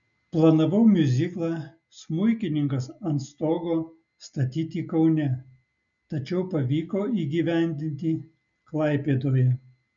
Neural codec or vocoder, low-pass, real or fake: none; 7.2 kHz; real